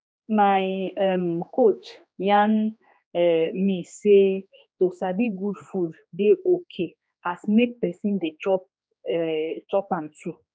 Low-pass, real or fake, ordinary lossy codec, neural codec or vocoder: none; fake; none; codec, 16 kHz, 2 kbps, X-Codec, HuBERT features, trained on general audio